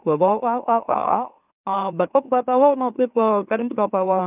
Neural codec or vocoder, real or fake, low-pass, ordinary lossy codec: autoencoder, 44.1 kHz, a latent of 192 numbers a frame, MeloTTS; fake; 3.6 kHz; none